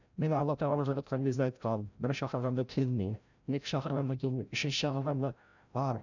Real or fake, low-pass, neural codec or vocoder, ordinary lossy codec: fake; 7.2 kHz; codec, 16 kHz, 0.5 kbps, FreqCodec, larger model; none